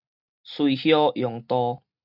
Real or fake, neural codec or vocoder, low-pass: real; none; 5.4 kHz